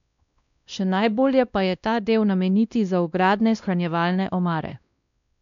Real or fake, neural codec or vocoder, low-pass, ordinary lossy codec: fake; codec, 16 kHz, 1 kbps, X-Codec, WavLM features, trained on Multilingual LibriSpeech; 7.2 kHz; none